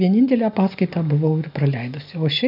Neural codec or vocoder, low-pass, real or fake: none; 5.4 kHz; real